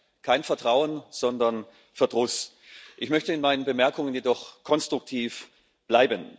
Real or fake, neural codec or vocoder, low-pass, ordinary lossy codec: real; none; none; none